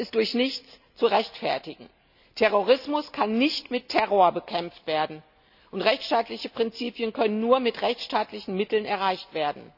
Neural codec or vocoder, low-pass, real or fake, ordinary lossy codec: none; 5.4 kHz; real; none